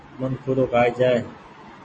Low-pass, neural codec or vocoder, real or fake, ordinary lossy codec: 9.9 kHz; none; real; MP3, 32 kbps